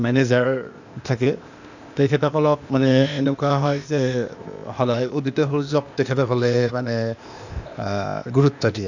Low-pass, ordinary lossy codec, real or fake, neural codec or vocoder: 7.2 kHz; none; fake; codec, 16 kHz, 0.8 kbps, ZipCodec